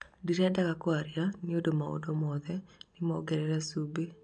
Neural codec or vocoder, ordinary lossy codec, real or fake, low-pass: none; none; real; 9.9 kHz